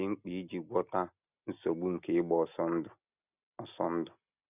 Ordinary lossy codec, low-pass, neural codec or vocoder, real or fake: none; 3.6 kHz; none; real